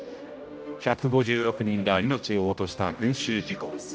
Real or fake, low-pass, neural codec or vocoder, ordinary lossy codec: fake; none; codec, 16 kHz, 0.5 kbps, X-Codec, HuBERT features, trained on general audio; none